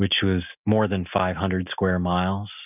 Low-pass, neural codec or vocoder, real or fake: 3.6 kHz; none; real